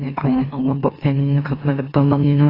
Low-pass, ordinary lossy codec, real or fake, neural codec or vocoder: 5.4 kHz; AAC, 24 kbps; fake; autoencoder, 44.1 kHz, a latent of 192 numbers a frame, MeloTTS